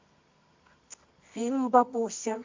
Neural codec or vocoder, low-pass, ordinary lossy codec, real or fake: codec, 24 kHz, 0.9 kbps, WavTokenizer, medium music audio release; 7.2 kHz; MP3, 48 kbps; fake